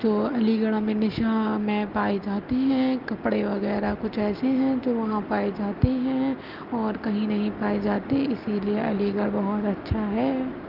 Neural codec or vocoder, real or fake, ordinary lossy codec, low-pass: none; real; Opus, 24 kbps; 5.4 kHz